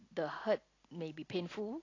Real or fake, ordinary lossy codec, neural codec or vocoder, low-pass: real; AAC, 32 kbps; none; 7.2 kHz